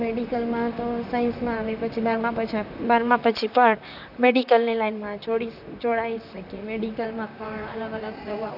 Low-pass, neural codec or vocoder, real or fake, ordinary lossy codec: 5.4 kHz; vocoder, 44.1 kHz, 128 mel bands, Pupu-Vocoder; fake; none